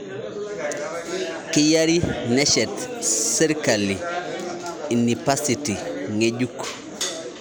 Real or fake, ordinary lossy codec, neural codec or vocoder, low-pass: real; none; none; none